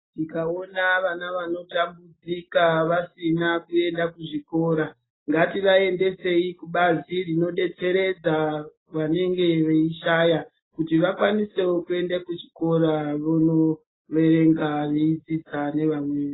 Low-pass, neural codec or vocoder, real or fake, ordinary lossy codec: 7.2 kHz; none; real; AAC, 16 kbps